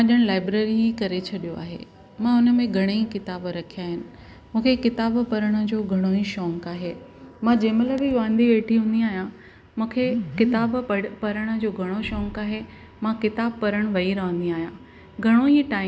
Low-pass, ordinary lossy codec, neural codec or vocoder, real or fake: none; none; none; real